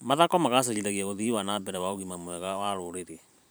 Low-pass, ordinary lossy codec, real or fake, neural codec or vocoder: none; none; fake; vocoder, 44.1 kHz, 128 mel bands every 512 samples, BigVGAN v2